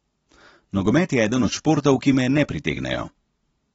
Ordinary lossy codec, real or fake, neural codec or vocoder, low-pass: AAC, 24 kbps; real; none; 19.8 kHz